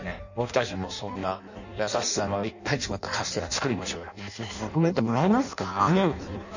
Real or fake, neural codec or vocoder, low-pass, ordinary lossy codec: fake; codec, 16 kHz in and 24 kHz out, 0.6 kbps, FireRedTTS-2 codec; 7.2 kHz; none